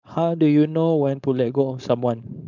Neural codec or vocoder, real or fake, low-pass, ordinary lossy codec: codec, 16 kHz, 4.8 kbps, FACodec; fake; 7.2 kHz; none